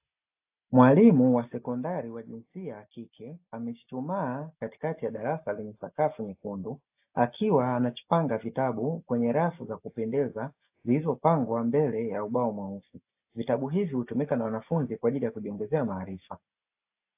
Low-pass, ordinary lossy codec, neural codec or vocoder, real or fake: 3.6 kHz; AAC, 32 kbps; none; real